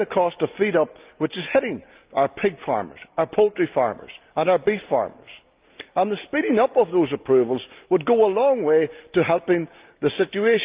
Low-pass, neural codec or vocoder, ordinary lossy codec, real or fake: 3.6 kHz; none; Opus, 32 kbps; real